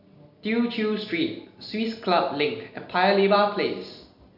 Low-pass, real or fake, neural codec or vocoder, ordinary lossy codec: 5.4 kHz; real; none; none